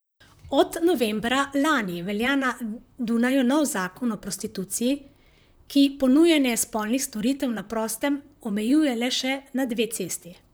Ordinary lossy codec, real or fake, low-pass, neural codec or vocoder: none; fake; none; vocoder, 44.1 kHz, 128 mel bands, Pupu-Vocoder